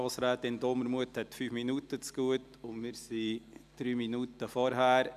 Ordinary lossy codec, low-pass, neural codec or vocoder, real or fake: none; 14.4 kHz; none; real